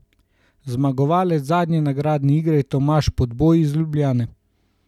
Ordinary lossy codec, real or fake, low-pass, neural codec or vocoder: none; real; 19.8 kHz; none